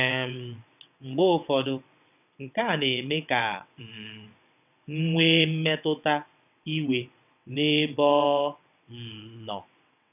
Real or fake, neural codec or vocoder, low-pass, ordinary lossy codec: fake; vocoder, 22.05 kHz, 80 mel bands, WaveNeXt; 3.6 kHz; none